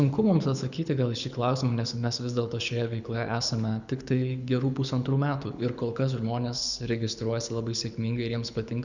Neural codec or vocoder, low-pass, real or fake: codec, 24 kHz, 6 kbps, HILCodec; 7.2 kHz; fake